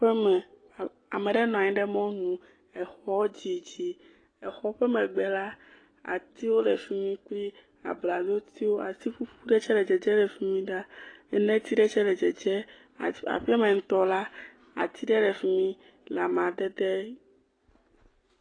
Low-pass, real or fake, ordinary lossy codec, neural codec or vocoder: 9.9 kHz; real; AAC, 32 kbps; none